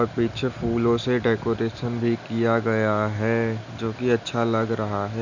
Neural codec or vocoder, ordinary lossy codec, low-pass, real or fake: none; none; 7.2 kHz; real